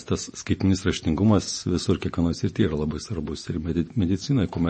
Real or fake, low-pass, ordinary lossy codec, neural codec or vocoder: fake; 10.8 kHz; MP3, 32 kbps; vocoder, 48 kHz, 128 mel bands, Vocos